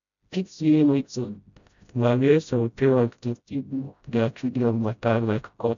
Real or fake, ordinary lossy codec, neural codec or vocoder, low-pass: fake; MP3, 96 kbps; codec, 16 kHz, 0.5 kbps, FreqCodec, smaller model; 7.2 kHz